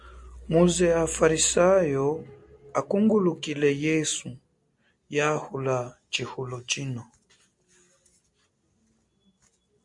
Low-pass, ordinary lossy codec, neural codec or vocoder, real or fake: 10.8 kHz; MP3, 64 kbps; none; real